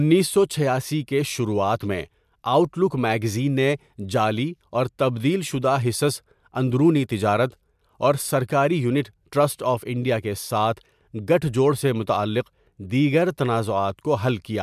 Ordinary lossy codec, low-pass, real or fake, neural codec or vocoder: MP3, 96 kbps; 14.4 kHz; real; none